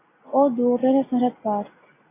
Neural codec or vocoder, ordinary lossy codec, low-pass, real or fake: none; AAC, 16 kbps; 3.6 kHz; real